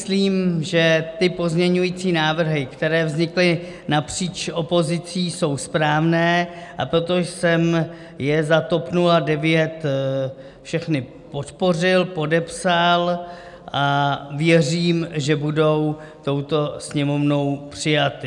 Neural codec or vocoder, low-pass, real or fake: none; 10.8 kHz; real